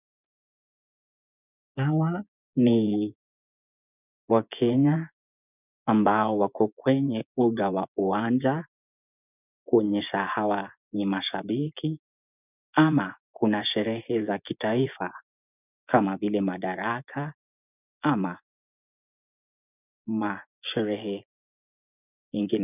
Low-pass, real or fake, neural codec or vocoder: 3.6 kHz; fake; vocoder, 24 kHz, 100 mel bands, Vocos